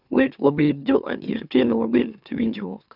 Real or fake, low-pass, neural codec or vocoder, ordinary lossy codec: fake; 5.4 kHz; autoencoder, 44.1 kHz, a latent of 192 numbers a frame, MeloTTS; none